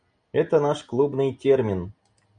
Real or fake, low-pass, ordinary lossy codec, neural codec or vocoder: real; 9.9 kHz; MP3, 96 kbps; none